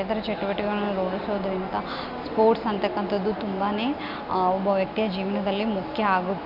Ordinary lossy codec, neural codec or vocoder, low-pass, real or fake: none; none; 5.4 kHz; real